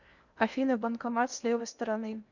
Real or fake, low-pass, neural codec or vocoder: fake; 7.2 kHz; codec, 16 kHz in and 24 kHz out, 0.8 kbps, FocalCodec, streaming, 65536 codes